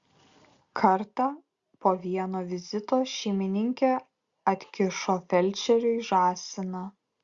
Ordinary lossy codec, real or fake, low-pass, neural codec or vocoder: Opus, 64 kbps; real; 7.2 kHz; none